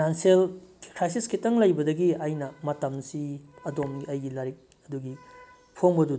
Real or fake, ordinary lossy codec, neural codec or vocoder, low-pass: real; none; none; none